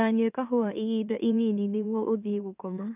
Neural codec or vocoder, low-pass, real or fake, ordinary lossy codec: autoencoder, 44.1 kHz, a latent of 192 numbers a frame, MeloTTS; 3.6 kHz; fake; none